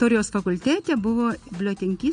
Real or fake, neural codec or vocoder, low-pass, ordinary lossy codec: real; none; 9.9 kHz; MP3, 48 kbps